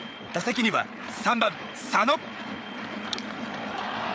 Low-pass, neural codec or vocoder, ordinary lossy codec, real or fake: none; codec, 16 kHz, 8 kbps, FreqCodec, larger model; none; fake